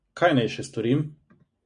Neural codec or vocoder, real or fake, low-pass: none; real; 9.9 kHz